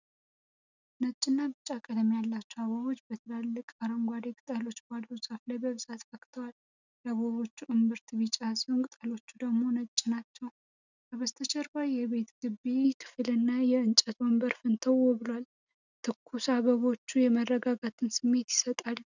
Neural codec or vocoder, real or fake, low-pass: none; real; 7.2 kHz